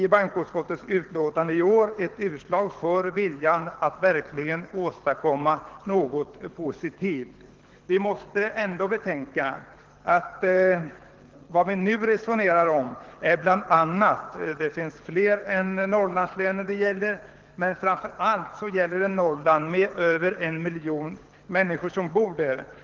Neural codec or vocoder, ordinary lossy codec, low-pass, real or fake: codec, 24 kHz, 6 kbps, HILCodec; Opus, 16 kbps; 7.2 kHz; fake